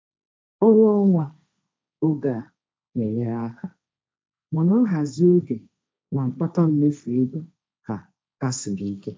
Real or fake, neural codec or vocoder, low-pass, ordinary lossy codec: fake; codec, 16 kHz, 1.1 kbps, Voila-Tokenizer; none; none